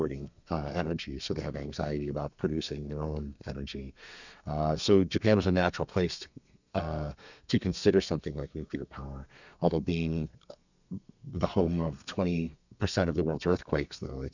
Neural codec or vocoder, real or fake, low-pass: codec, 32 kHz, 1.9 kbps, SNAC; fake; 7.2 kHz